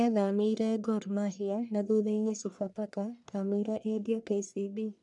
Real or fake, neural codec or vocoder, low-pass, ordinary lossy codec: fake; codec, 44.1 kHz, 1.7 kbps, Pupu-Codec; 10.8 kHz; none